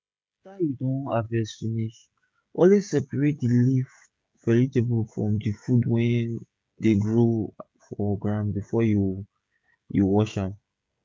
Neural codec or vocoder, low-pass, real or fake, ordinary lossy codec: codec, 16 kHz, 16 kbps, FreqCodec, smaller model; none; fake; none